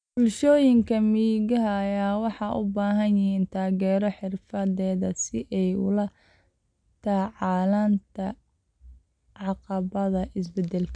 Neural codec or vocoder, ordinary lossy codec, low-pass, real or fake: none; none; 9.9 kHz; real